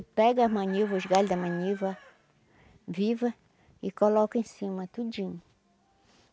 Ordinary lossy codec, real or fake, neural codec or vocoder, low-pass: none; real; none; none